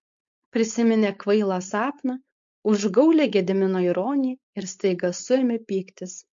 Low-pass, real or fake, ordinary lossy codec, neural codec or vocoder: 7.2 kHz; fake; MP3, 48 kbps; codec, 16 kHz, 4.8 kbps, FACodec